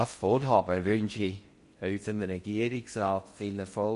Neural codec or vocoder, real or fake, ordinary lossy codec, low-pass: codec, 16 kHz in and 24 kHz out, 0.6 kbps, FocalCodec, streaming, 4096 codes; fake; MP3, 48 kbps; 10.8 kHz